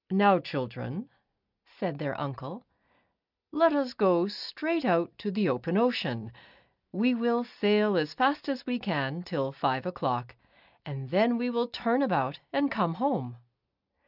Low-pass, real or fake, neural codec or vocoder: 5.4 kHz; real; none